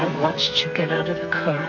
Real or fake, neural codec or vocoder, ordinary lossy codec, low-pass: fake; codec, 44.1 kHz, 2.6 kbps, SNAC; MP3, 48 kbps; 7.2 kHz